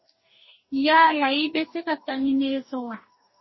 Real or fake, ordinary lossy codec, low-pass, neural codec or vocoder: fake; MP3, 24 kbps; 7.2 kHz; codec, 44.1 kHz, 2.6 kbps, DAC